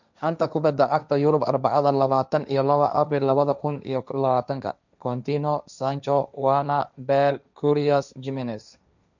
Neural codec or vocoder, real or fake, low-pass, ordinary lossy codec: codec, 16 kHz, 1.1 kbps, Voila-Tokenizer; fake; 7.2 kHz; none